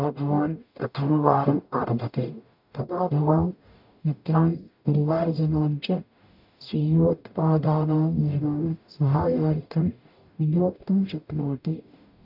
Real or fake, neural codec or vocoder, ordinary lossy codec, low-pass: fake; codec, 44.1 kHz, 0.9 kbps, DAC; none; 5.4 kHz